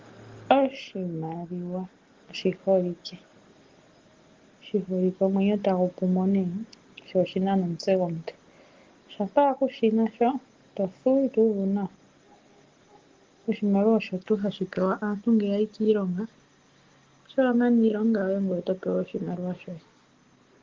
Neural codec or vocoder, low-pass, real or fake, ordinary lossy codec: none; 7.2 kHz; real; Opus, 16 kbps